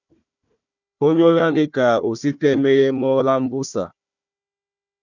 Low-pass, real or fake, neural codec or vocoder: 7.2 kHz; fake; codec, 16 kHz, 1 kbps, FunCodec, trained on Chinese and English, 50 frames a second